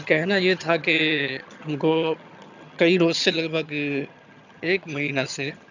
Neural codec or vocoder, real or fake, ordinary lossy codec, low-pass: vocoder, 22.05 kHz, 80 mel bands, HiFi-GAN; fake; none; 7.2 kHz